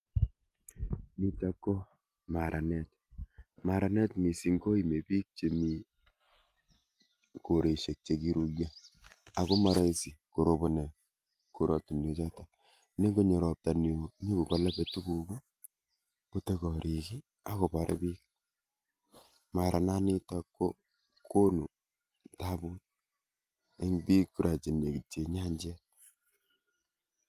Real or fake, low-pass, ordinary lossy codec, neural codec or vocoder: real; 14.4 kHz; Opus, 32 kbps; none